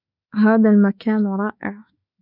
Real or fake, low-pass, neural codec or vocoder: fake; 5.4 kHz; autoencoder, 48 kHz, 32 numbers a frame, DAC-VAE, trained on Japanese speech